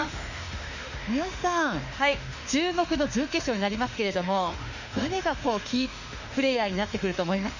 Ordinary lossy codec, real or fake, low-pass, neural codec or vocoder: none; fake; 7.2 kHz; autoencoder, 48 kHz, 32 numbers a frame, DAC-VAE, trained on Japanese speech